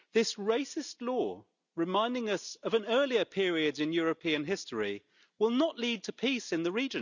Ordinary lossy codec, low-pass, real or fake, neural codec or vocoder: none; 7.2 kHz; real; none